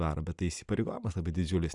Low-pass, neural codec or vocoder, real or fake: 10.8 kHz; none; real